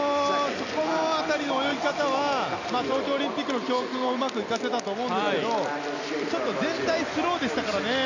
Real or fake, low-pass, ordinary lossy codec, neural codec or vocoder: real; 7.2 kHz; none; none